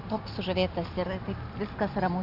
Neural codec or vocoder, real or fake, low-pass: none; real; 5.4 kHz